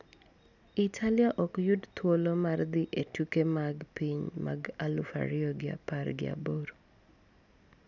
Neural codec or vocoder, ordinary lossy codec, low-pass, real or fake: none; none; 7.2 kHz; real